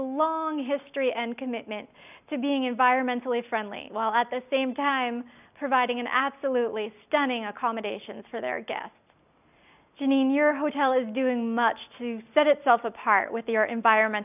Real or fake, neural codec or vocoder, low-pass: real; none; 3.6 kHz